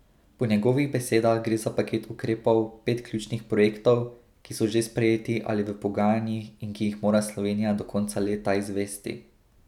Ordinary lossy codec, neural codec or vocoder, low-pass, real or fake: none; none; 19.8 kHz; real